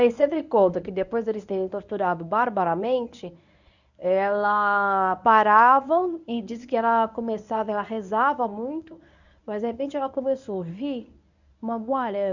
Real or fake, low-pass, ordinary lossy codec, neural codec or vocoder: fake; 7.2 kHz; none; codec, 24 kHz, 0.9 kbps, WavTokenizer, medium speech release version 1